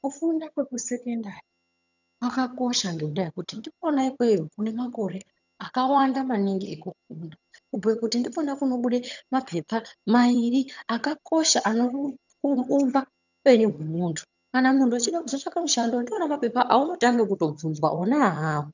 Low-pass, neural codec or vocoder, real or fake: 7.2 kHz; vocoder, 22.05 kHz, 80 mel bands, HiFi-GAN; fake